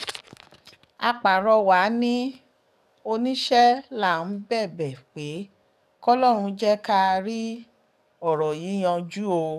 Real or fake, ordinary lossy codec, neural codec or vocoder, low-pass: fake; none; codec, 44.1 kHz, 7.8 kbps, DAC; 14.4 kHz